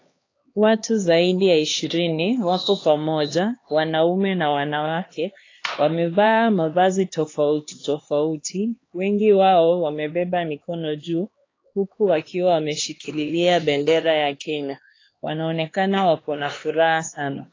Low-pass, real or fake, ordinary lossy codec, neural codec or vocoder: 7.2 kHz; fake; AAC, 32 kbps; codec, 16 kHz, 2 kbps, X-Codec, HuBERT features, trained on LibriSpeech